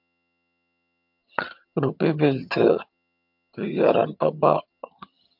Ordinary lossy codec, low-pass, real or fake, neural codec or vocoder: MP3, 48 kbps; 5.4 kHz; fake; vocoder, 22.05 kHz, 80 mel bands, HiFi-GAN